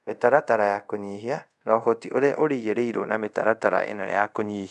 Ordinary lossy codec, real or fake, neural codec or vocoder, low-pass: none; fake; codec, 24 kHz, 0.5 kbps, DualCodec; 10.8 kHz